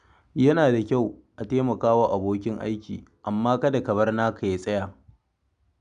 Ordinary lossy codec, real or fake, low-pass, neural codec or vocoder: none; real; 9.9 kHz; none